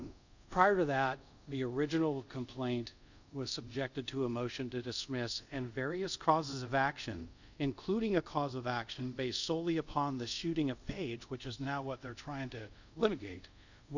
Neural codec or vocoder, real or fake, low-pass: codec, 24 kHz, 0.5 kbps, DualCodec; fake; 7.2 kHz